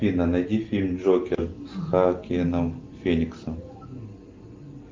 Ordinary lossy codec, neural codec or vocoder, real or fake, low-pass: Opus, 32 kbps; none; real; 7.2 kHz